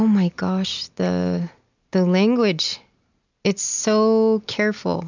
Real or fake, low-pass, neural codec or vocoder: real; 7.2 kHz; none